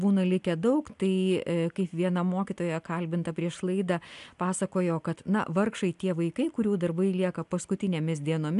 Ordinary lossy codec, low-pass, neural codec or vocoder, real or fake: AAC, 96 kbps; 10.8 kHz; none; real